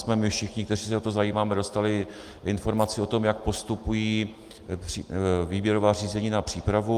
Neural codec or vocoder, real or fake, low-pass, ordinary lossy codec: none; real; 14.4 kHz; Opus, 32 kbps